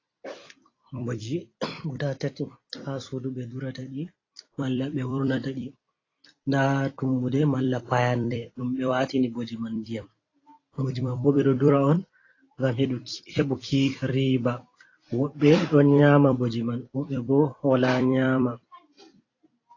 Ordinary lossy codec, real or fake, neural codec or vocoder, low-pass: AAC, 32 kbps; fake; vocoder, 44.1 kHz, 128 mel bands every 256 samples, BigVGAN v2; 7.2 kHz